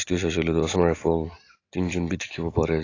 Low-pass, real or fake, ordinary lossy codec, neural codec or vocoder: 7.2 kHz; real; AAC, 32 kbps; none